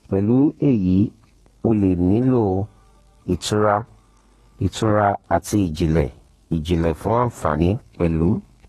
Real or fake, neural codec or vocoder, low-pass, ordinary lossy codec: fake; codec, 32 kHz, 1.9 kbps, SNAC; 14.4 kHz; AAC, 32 kbps